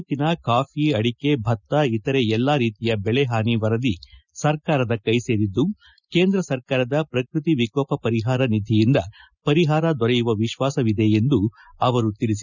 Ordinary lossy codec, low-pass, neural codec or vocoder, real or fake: none; 7.2 kHz; none; real